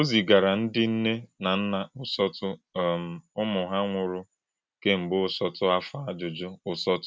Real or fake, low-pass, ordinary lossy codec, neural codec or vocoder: real; none; none; none